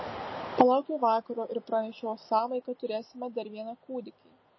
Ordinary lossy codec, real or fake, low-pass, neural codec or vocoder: MP3, 24 kbps; real; 7.2 kHz; none